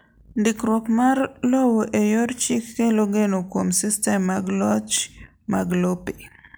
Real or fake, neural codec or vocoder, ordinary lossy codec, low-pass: real; none; none; none